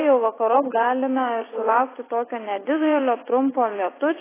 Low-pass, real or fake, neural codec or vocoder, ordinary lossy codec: 3.6 kHz; fake; vocoder, 44.1 kHz, 80 mel bands, Vocos; AAC, 16 kbps